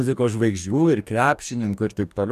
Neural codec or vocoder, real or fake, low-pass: codec, 44.1 kHz, 2.6 kbps, DAC; fake; 14.4 kHz